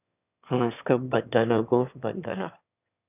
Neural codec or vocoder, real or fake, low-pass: autoencoder, 22.05 kHz, a latent of 192 numbers a frame, VITS, trained on one speaker; fake; 3.6 kHz